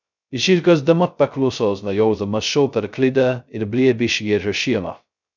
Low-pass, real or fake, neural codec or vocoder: 7.2 kHz; fake; codec, 16 kHz, 0.2 kbps, FocalCodec